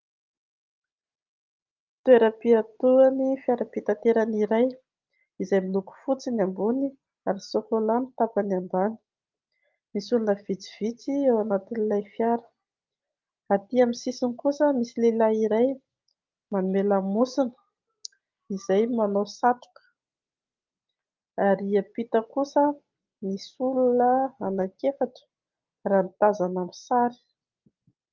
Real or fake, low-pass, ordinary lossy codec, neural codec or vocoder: real; 7.2 kHz; Opus, 32 kbps; none